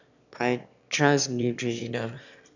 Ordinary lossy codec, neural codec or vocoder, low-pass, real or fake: none; autoencoder, 22.05 kHz, a latent of 192 numbers a frame, VITS, trained on one speaker; 7.2 kHz; fake